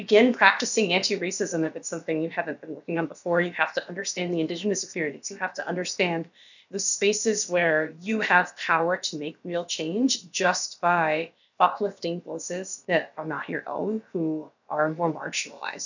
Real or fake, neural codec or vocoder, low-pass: fake; codec, 16 kHz, about 1 kbps, DyCAST, with the encoder's durations; 7.2 kHz